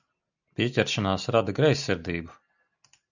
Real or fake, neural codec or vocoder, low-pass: real; none; 7.2 kHz